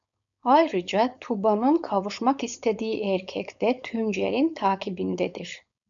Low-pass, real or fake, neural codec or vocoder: 7.2 kHz; fake; codec, 16 kHz, 4.8 kbps, FACodec